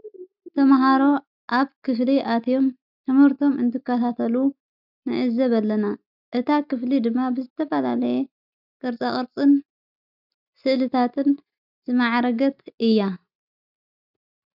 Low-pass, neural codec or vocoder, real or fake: 5.4 kHz; none; real